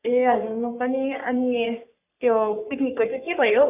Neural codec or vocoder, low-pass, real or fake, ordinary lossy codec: codec, 44.1 kHz, 3.4 kbps, Pupu-Codec; 3.6 kHz; fake; none